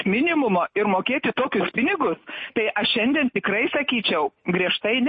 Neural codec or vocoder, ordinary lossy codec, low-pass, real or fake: none; MP3, 32 kbps; 9.9 kHz; real